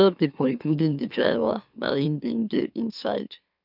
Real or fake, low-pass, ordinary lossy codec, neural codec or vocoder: fake; 5.4 kHz; none; autoencoder, 44.1 kHz, a latent of 192 numbers a frame, MeloTTS